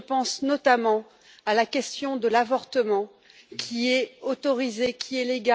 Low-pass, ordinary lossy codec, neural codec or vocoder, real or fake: none; none; none; real